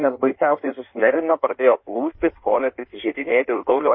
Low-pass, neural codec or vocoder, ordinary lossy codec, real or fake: 7.2 kHz; codec, 16 kHz in and 24 kHz out, 1.1 kbps, FireRedTTS-2 codec; MP3, 24 kbps; fake